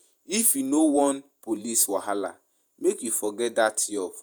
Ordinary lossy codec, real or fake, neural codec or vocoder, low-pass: none; fake; vocoder, 48 kHz, 128 mel bands, Vocos; none